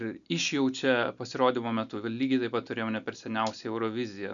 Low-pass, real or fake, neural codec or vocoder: 7.2 kHz; real; none